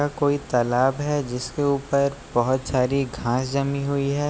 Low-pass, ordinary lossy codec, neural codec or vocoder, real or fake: none; none; none; real